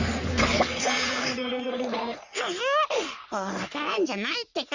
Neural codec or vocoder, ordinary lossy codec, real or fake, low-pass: codec, 44.1 kHz, 3.4 kbps, Pupu-Codec; Opus, 64 kbps; fake; 7.2 kHz